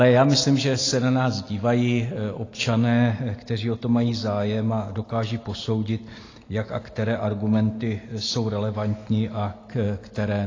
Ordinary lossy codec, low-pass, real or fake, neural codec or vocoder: AAC, 32 kbps; 7.2 kHz; real; none